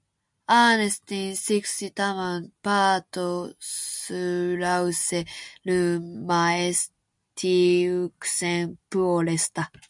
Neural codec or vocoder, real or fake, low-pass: none; real; 10.8 kHz